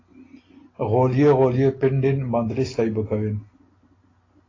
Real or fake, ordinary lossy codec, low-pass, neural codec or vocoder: real; AAC, 32 kbps; 7.2 kHz; none